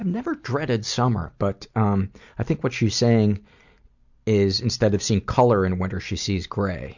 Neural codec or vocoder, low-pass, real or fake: none; 7.2 kHz; real